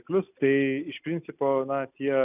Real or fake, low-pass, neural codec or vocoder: real; 3.6 kHz; none